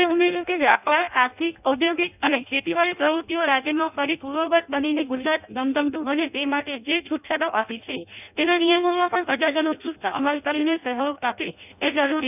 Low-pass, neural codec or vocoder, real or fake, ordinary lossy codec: 3.6 kHz; codec, 16 kHz in and 24 kHz out, 0.6 kbps, FireRedTTS-2 codec; fake; none